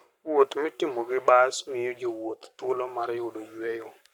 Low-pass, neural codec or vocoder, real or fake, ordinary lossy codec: 19.8 kHz; codec, 44.1 kHz, 7.8 kbps, Pupu-Codec; fake; none